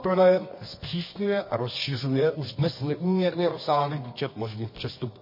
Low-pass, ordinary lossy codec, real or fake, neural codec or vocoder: 5.4 kHz; MP3, 24 kbps; fake; codec, 24 kHz, 0.9 kbps, WavTokenizer, medium music audio release